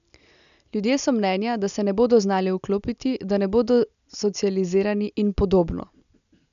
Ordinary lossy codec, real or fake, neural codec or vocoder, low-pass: none; real; none; 7.2 kHz